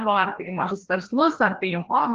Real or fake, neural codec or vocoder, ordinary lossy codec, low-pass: fake; codec, 16 kHz, 1 kbps, FreqCodec, larger model; Opus, 16 kbps; 7.2 kHz